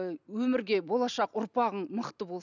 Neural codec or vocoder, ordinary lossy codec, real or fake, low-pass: none; none; real; 7.2 kHz